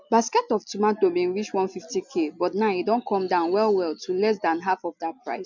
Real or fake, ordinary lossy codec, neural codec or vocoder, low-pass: real; none; none; 7.2 kHz